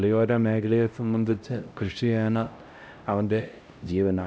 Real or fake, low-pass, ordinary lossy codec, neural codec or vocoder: fake; none; none; codec, 16 kHz, 0.5 kbps, X-Codec, HuBERT features, trained on LibriSpeech